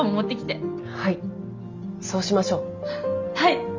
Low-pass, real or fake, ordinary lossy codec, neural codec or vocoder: 7.2 kHz; real; Opus, 32 kbps; none